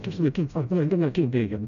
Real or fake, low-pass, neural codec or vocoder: fake; 7.2 kHz; codec, 16 kHz, 0.5 kbps, FreqCodec, smaller model